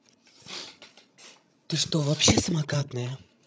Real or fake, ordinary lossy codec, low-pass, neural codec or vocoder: fake; none; none; codec, 16 kHz, 16 kbps, FreqCodec, larger model